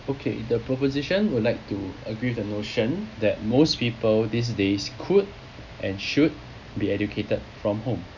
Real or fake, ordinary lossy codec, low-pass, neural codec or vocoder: real; none; 7.2 kHz; none